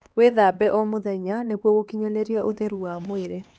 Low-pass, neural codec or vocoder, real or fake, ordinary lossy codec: none; codec, 16 kHz, 2 kbps, X-Codec, HuBERT features, trained on LibriSpeech; fake; none